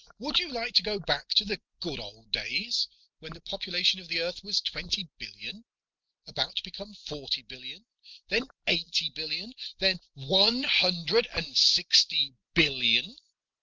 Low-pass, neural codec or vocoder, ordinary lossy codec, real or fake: 7.2 kHz; none; Opus, 24 kbps; real